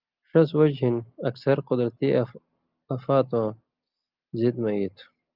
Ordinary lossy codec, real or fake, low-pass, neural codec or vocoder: Opus, 24 kbps; real; 5.4 kHz; none